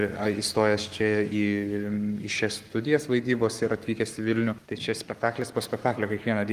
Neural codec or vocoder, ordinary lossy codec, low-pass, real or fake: codec, 44.1 kHz, 7.8 kbps, Pupu-Codec; Opus, 32 kbps; 14.4 kHz; fake